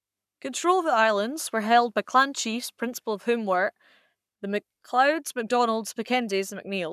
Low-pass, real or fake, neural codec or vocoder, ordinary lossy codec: 14.4 kHz; fake; codec, 44.1 kHz, 7.8 kbps, Pupu-Codec; none